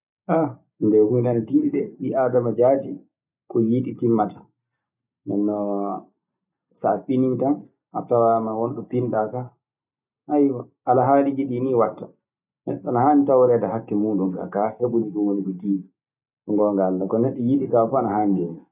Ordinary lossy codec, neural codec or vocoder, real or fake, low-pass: none; none; real; 3.6 kHz